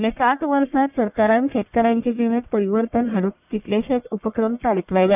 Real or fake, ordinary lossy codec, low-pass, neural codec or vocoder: fake; none; 3.6 kHz; codec, 44.1 kHz, 1.7 kbps, Pupu-Codec